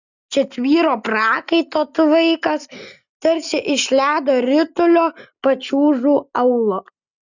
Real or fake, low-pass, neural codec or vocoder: real; 7.2 kHz; none